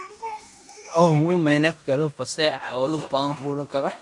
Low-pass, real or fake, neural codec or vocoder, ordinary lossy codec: 10.8 kHz; fake; codec, 16 kHz in and 24 kHz out, 0.9 kbps, LongCat-Audio-Codec, fine tuned four codebook decoder; MP3, 64 kbps